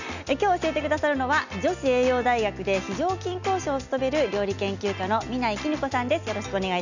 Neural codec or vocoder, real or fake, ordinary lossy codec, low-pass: none; real; none; 7.2 kHz